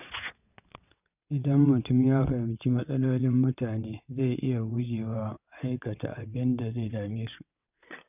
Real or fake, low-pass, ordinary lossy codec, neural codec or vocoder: fake; 3.6 kHz; none; vocoder, 22.05 kHz, 80 mel bands, WaveNeXt